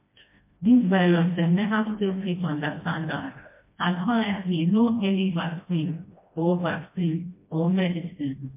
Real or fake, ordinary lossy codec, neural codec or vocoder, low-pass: fake; MP3, 24 kbps; codec, 16 kHz, 1 kbps, FreqCodec, smaller model; 3.6 kHz